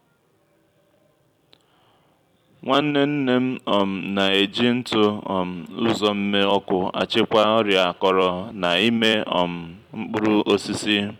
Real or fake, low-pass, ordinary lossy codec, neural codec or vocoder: fake; 19.8 kHz; none; vocoder, 44.1 kHz, 128 mel bands every 256 samples, BigVGAN v2